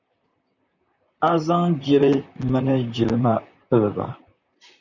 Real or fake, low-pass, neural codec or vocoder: fake; 7.2 kHz; vocoder, 44.1 kHz, 128 mel bands, Pupu-Vocoder